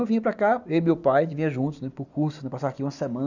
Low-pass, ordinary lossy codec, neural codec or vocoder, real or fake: 7.2 kHz; none; none; real